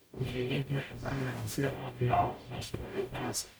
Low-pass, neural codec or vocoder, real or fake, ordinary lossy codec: none; codec, 44.1 kHz, 0.9 kbps, DAC; fake; none